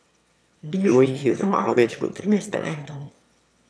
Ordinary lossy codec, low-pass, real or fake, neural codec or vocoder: none; none; fake; autoencoder, 22.05 kHz, a latent of 192 numbers a frame, VITS, trained on one speaker